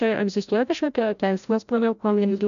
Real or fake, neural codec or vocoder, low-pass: fake; codec, 16 kHz, 0.5 kbps, FreqCodec, larger model; 7.2 kHz